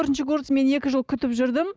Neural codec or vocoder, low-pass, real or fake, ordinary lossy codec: none; none; real; none